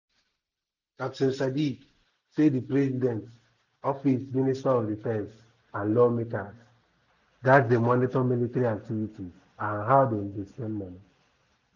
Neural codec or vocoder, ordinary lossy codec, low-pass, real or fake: none; none; 7.2 kHz; real